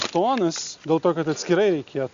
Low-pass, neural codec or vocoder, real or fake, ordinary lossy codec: 7.2 kHz; none; real; Opus, 64 kbps